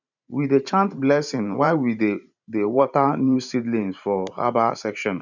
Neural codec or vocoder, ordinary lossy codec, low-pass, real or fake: vocoder, 44.1 kHz, 128 mel bands every 512 samples, BigVGAN v2; none; 7.2 kHz; fake